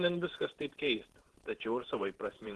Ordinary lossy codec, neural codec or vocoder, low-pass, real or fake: Opus, 16 kbps; vocoder, 48 kHz, 128 mel bands, Vocos; 10.8 kHz; fake